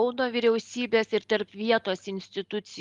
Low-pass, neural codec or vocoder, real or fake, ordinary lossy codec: 10.8 kHz; none; real; Opus, 32 kbps